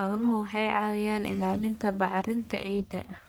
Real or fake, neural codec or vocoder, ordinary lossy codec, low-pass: fake; codec, 44.1 kHz, 1.7 kbps, Pupu-Codec; none; none